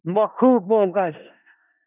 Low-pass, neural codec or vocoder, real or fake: 3.6 kHz; codec, 16 kHz in and 24 kHz out, 0.4 kbps, LongCat-Audio-Codec, four codebook decoder; fake